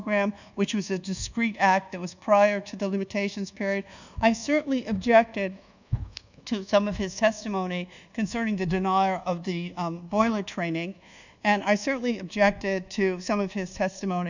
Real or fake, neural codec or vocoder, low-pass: fake; codec, 24 kHz, 1.2 kbps, DualCodec; 7.2 kHz